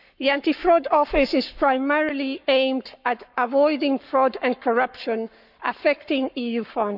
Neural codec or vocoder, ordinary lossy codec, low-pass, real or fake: codec, 44.1 kHz, 7.8 kbps, Pupu-Codec; none; 5.4 kHz; fake